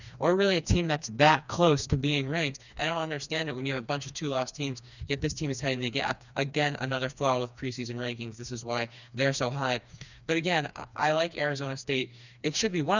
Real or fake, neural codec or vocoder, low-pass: fake; codec, 16 kHz, 2 kbps, FreqCodec, smaller model; 7.2 kHz